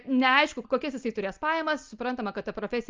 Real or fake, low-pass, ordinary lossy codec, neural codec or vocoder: real; 7.2 kHz; Opus, 24 kbps; none